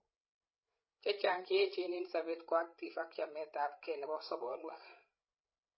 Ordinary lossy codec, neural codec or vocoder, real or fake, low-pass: MP3, 24 kbps; codec, 16 kHz, 16 kbps, FreqCodec, larger model; fake; 7.2 kHz